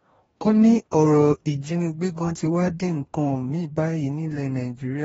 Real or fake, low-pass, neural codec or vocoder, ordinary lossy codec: fake; 19.8 kHz; codec, 44.1 kHz, 2.6 kbps, DAC; AAC, 24 kbps